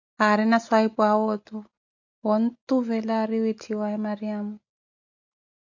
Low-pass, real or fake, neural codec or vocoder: 7.2 kHz; real; none